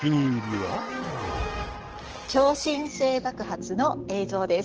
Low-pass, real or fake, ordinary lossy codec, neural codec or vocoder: 7.2 kHz; fake; Opus, 16 kbps; codec, 44.1 kHz, 7.8 kbps, DAC